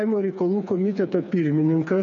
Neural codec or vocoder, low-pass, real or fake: codec, 16 kHz, 4 kbps, FreqCodec, smaller model; 7.2 kHz; fake